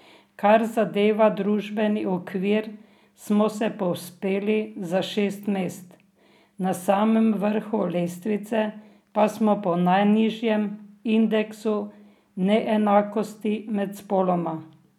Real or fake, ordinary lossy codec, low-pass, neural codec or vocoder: real; none; 19.8 kHz; none